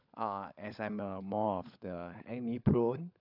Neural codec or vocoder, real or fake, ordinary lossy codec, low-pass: codec, 16 kHz, 16 kbps, FunCodec, trained on LibriTTS, 50 frames a second; fake; none; 5.4 kHz